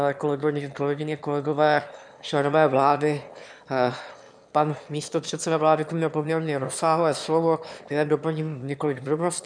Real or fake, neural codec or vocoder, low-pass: fake; autoencoder, 22.05 kHz, a latent of 192 numbers a frame, VITS, trained on one speaker; 9.9 kHz